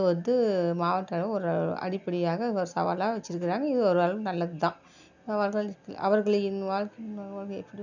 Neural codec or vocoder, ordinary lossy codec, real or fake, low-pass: none; none; real; 7.2 kHz